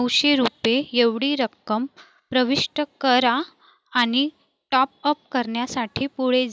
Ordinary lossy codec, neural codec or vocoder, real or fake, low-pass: none; none; real; none